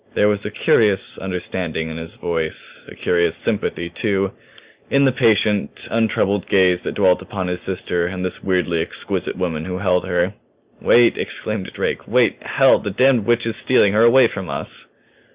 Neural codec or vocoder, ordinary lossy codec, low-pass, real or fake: none; Opus, 64 kbps; 3.6 kHz; real